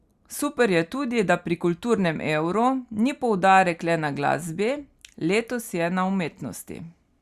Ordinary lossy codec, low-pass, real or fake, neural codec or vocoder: Opus, 64 kbps; 14.4 kHz; real; none